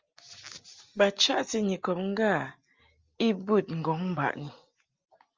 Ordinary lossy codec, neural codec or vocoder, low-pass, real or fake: Opus, 32 kbps; none; 7.2 kHz; real